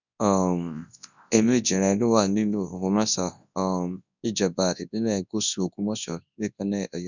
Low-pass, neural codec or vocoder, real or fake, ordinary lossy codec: 7.2 kHz; codec, 24 kHz, 0.9 kbps, WavTokenizer, large speech release; fake; none